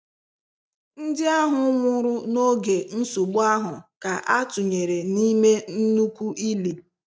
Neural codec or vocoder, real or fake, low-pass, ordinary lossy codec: none; real; none; none